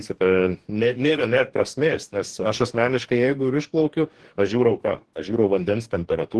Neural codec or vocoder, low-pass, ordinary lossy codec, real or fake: codec, 44.1 kHz, 2.6 kbps, DAC; 10.8 kHz; Opus, 16 kbps; fake